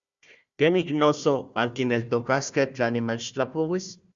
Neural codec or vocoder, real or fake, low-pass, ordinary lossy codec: codec, 16 kHz, 1 kbps, FunCodec, trained on Chinese and English, 50 frames a second; fake; 7.2 kHz; Opus, 64 kbps